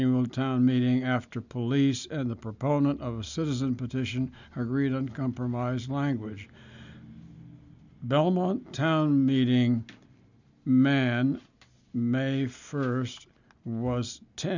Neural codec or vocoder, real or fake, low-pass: none; real; 7.2 kHz